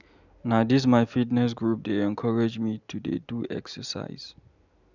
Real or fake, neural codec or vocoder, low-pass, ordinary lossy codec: real; none; 7.2 kHz; none